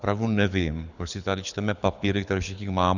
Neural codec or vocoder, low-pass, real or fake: codec, 24 kHz, 6 kbps, HILCodec; 7.2 kHz; fake